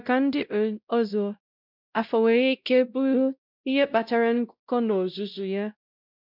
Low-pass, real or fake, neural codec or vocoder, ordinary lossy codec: 5.4 kHz; fake; codec, 16 kHz, 0.5 kbps, X-Codec, WavLM features, trained on Multilingual LibriSpeech; none